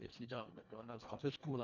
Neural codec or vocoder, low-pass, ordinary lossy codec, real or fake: codec, 24 kHz, 1.5 kbps, HILCodec; 7.2 kHz; none; fake